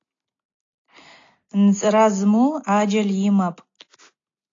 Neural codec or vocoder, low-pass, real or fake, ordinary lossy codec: none; 7.2 kHz; real; AAC, 48 kbps